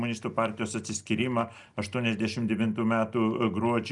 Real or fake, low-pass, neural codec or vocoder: real; 10.8 kHz; none